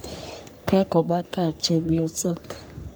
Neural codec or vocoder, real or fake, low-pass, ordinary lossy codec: codec, 44.1 kHz, 3.4 kbps, Pupu-Codec; fake; none; none